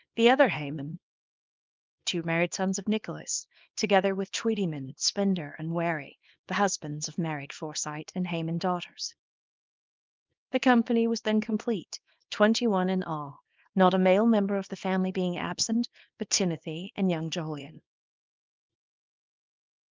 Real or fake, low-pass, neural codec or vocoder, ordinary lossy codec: fake; 7.2 kHz; codec, 24 kHz, 0.9 kbps, WavTokenizer, small release; Opus, 24 kbps